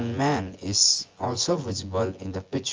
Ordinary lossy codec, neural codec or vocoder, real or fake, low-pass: Opus, 24 kbps; vocoder, 24 kHz, 100 mel bands, Vocos; fake; 7.2 kHz